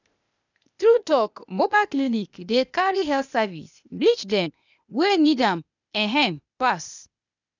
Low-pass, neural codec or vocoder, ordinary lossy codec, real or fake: 7.2 kHz; codec, 16 kHz, 0.8 kbps, ZipCodec; none; fake